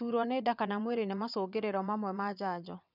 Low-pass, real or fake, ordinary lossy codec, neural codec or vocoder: 5.4 kHz; fake; none; vocoder, 22.05 kHz, 80 mel bands, WaveNeXt